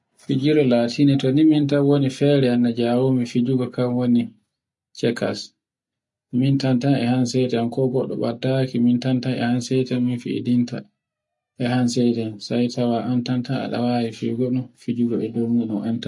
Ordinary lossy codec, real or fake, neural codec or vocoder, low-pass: MP3, 48 kbps; real; none; 10.8 kHz